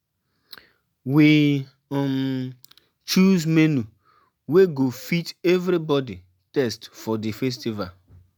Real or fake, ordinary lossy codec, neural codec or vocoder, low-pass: real; none; none; none